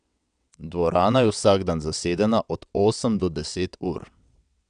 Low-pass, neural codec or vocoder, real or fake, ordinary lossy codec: 9.9 kHz; vocoder, 22.05 kHz, 80 mel bands, WaveNeXt; fake; none